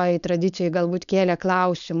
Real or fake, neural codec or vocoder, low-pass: fake; codec, 16 kHz, 4.8 kbps, FACodec; 7.2 kHz